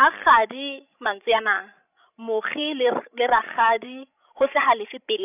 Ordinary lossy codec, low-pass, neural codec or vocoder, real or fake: none; 3.6 kHz; codec, 16 kHz, 16 kbps, FreqCodec, larger model; fake